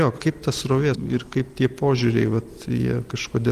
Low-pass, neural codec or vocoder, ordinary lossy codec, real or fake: 14.4 kHz; none; Opus, 24 kbps; real